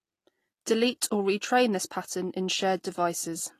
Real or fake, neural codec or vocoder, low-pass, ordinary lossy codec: real; none; 14.4 kHz; AAC, 48 kbps